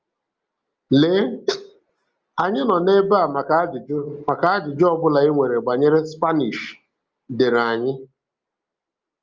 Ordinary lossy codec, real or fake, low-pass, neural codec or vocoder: Opus, 24 kbps; real; 7.2 kHz; none